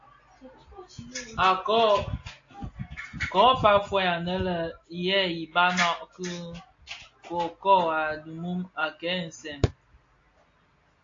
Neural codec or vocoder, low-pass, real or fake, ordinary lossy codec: none; 7.2 kHz; real; AAC, 48 kbps